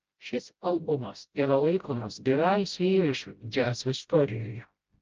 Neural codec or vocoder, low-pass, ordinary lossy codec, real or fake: codec, 16 kHz, 0.5 kbps, FreqCodec, smaller model; 7.2 kHz; Opus, 24 kbps; fake